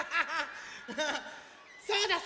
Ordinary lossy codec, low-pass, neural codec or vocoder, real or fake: none; none; none; real